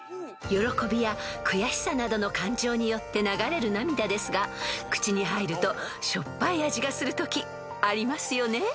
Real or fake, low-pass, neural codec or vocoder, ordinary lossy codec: real; none; none; none